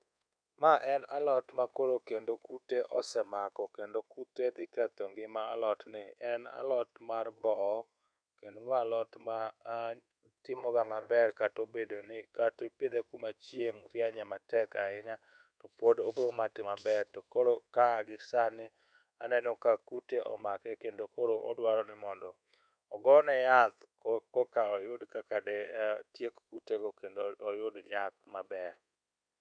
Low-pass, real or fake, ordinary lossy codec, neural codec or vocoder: 9.9 kHz; fake; none; codec, 24 kHz, 1.2 kbps, DualCodec